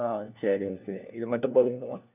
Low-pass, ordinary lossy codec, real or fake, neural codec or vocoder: 3.6 kHz; none; fake; codec, 16 kHz, 1 kbps, FunCodec, trained on LibriTTS, 50 frames a second